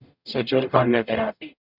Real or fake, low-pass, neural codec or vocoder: fake; 5.4 kHz; codec, 44.1 kHz, 0.9 kbps, DAC